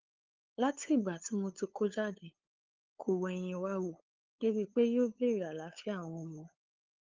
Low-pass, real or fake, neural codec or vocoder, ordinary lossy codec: 7.2 kHz; fake; codec, 16 kHz, 8 kbps, FunCodec, trained on LibriTTS, 25 frames a second; Opus, 32 kbps